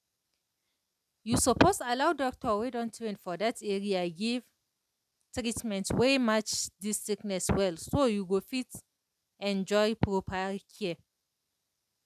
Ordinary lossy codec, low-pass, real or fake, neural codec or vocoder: none; 14.4 kHz; real; none